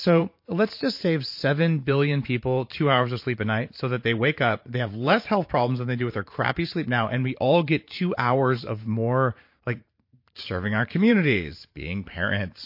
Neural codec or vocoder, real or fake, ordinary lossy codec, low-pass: vocoder, 44.1 kHz, 128 mel bands every 512 samples, BigVGAN v2; fake; MP3, 32 kbps; 5.4 kHz